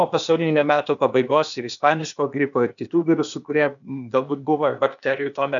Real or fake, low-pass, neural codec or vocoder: fake; 7.2 kHz; codec, 16 kHz, 0.8 kbps, ZipCodec